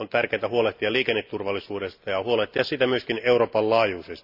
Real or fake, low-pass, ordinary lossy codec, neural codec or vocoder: real; 5.4 kHz; none; none